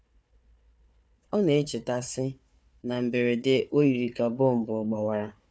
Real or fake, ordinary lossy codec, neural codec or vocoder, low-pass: fake; none; codec, 16 kHz, 4 kbps, FunCodec, trained on Chinese and English, 50 frames a second; none